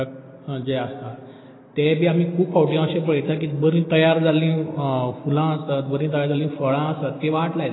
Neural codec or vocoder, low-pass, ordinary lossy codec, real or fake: none; 7.2 kHz; AAC, 16 kbps; real